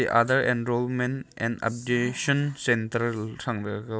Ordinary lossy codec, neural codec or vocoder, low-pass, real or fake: none; none; none; real